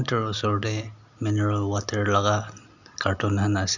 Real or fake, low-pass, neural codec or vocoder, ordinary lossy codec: real; 7.2 kHz; none; none